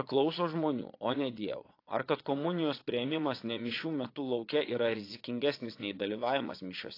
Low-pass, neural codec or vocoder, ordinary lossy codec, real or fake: 5.4 kHz; vocoder, 22.05 kHz, 80 mel bands, Vocos; AAC, 32 kbps; fake